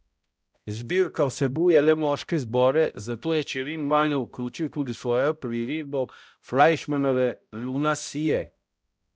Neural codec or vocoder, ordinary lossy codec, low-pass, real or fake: codec, 16 kHz, 0.5 kbps, X-Codec, HuBERT features, trained on balanced general audio; none; none; fake